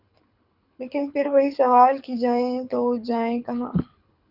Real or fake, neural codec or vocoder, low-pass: fake; codec, 24 kHz, 6 kbps, HILCodec; 5.4 kHz